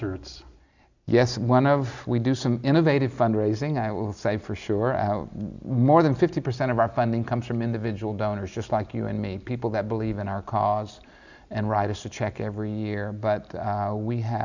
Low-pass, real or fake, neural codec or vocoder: 7.2 kHz; real; none